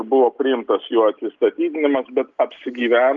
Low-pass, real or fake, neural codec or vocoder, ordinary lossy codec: 7.2 kHz; real; none; Opus, 32 kbps